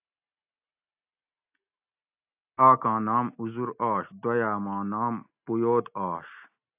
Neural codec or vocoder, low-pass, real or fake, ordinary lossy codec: none; 3.6 kHz; real; AAC, 32 kbps